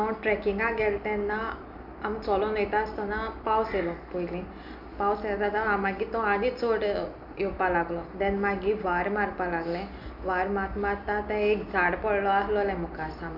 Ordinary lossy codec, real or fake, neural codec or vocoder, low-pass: none; real; none; 5.4 kHz